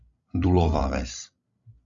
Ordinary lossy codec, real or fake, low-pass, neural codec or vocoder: Opus, 64 kbps; fake; 7.2 kHz; codec, 16 kHz, 16 kbps, FreqCodec, larger model